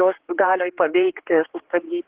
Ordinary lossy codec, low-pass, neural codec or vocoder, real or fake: Opus, 16 kbps; 3.6 kHz; codec, 16 kHz, 4 kbps, X-Codec, HuBERT features, trained on general audio; fake